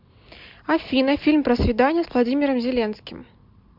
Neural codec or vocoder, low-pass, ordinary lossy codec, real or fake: none; 5.4 kHz; MP3, 48 kbps; real